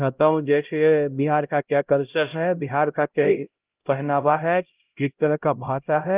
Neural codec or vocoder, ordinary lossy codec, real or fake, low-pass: codec, 16 kHz, 0.5 kbps, X-Codec, HuBERT features, trained on LibriSpeech; Opus, 32 kbps; fake; 3.6 kHz